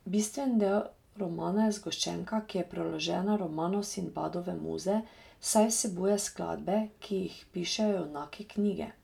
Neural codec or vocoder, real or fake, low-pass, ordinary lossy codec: none; real; 19.8 kHz; none